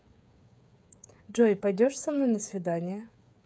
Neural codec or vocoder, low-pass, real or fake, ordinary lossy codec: codec, 16 kHz, 8 kbps, FreqCodec, smaller model; none; fake; none